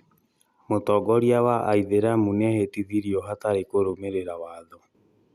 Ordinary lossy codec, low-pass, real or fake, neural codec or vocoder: Opus, 64 kbps; 14.4 kHz; real; none